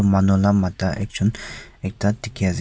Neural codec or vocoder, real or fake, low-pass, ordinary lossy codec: none; real; none; none